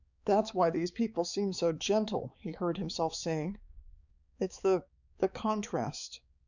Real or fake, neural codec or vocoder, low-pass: fake; codec, 16 kHz, 4 kbps, X-Codec, HuBERT features, trained on balanced general audio; 7.2 kHz